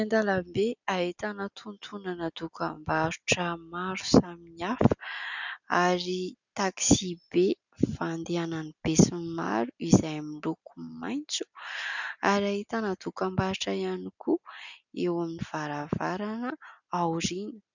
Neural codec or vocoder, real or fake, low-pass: none; real; 7.2 kHz